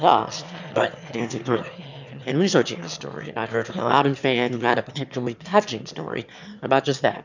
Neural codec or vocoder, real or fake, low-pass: autoencoder, 22.05 kHz, a latent of 192 numbers a frame, VITS, trained on one speaker; fake; 7.2 kHz